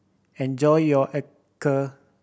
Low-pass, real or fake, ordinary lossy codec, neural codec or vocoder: none; real; none; none